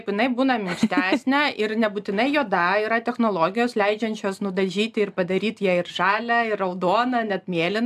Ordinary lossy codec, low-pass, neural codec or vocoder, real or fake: AAC, 96 kbps; 14.4 kHz; none; real